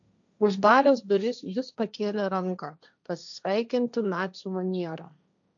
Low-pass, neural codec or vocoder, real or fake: 7.2 kHz; codec, 16 kHz, 1.1 kbps, Voila-Tokenizer; fake